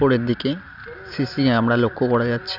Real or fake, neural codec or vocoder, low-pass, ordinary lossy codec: real; none; 5.4 kHz; none